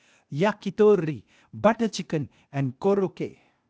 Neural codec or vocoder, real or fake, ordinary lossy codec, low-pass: codec, 16 kHz, 0.8 kbps, ZipCodec; fake; none; none